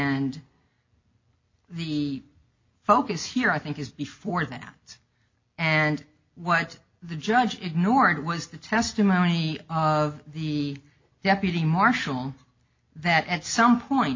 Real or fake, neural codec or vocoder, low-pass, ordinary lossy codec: real; none; 7.2 kHz; MP3, 48 kbps